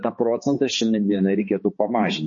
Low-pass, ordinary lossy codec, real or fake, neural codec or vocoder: 7.2 kHz; MP3, 32 kbps; fake; codec, 16 kHz, 4 kbps, X-Codec, HuBERT features, trained on balanced general audio